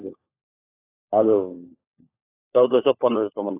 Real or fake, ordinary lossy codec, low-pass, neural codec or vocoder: fake; AAC, 16 kbps; 3.6 kHz; codec, 16 kHz, 4 kbps, FunCodec, trained on LibriTTS, 50 frames a second